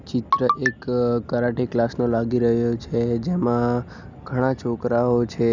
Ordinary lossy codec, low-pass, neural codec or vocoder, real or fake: none; 7.2 kHz; none; real